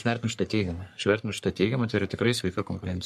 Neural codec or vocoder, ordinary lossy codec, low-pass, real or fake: codec, 44.1 kHz, 3.4 kbps, Pupu-Codec; MP3, 96 kbps; 14.4 kHz; fake